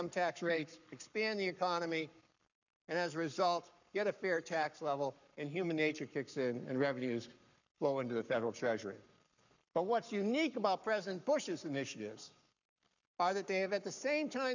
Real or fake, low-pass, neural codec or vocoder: fake; 7.2 kHz; codec, 44.1 kHz, 7.8 kbps, Pupu-Codec